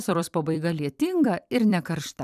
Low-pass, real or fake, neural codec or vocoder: 14.4 kHz; fake; vocoder, 44.1 kHz, 128 mel bands every 256 samples, BigVGAN v2